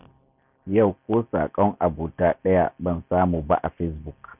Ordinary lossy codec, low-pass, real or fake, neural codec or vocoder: none; 3.6 kHz; real; none